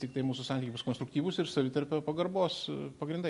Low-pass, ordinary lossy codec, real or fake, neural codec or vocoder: 10.8 kHz; MP3, 48 kbps; real; none